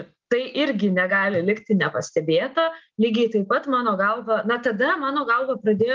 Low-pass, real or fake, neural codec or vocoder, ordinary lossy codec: 7.2 kHz; real; none; Opus, 32 kbps